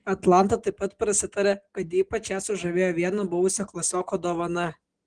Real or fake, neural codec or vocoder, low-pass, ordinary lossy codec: real; none; 9.9 kHz; Opus, 16 kbps